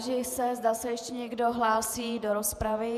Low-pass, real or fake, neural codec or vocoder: 14.4 kHz; fake; vocoder, 48 kHz, 128 mel bands, Vocos